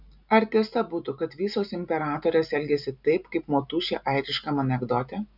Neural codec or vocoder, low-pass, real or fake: none; 5.4 kHz; real